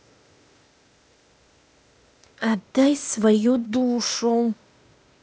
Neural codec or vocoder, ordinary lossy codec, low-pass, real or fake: codec, 16 kHz, 0.8 kbps, ZipCodec; none; none; fake